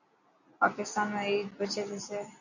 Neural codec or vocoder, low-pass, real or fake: none; 7.2 kHz; real